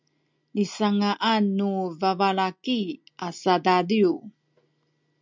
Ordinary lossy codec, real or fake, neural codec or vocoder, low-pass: MP3, 64 kbps; real; none; 7.2 kHz